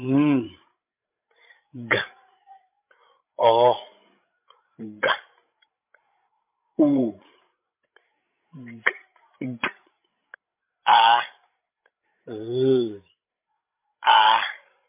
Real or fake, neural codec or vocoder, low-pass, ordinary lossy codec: fake; codec, 16 kHz, 16 kbps, FreqCodec, larger model; 3.6 kHz; MP3, 32 kbps